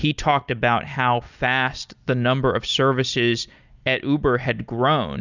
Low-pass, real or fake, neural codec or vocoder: 7.2 kHz; real; none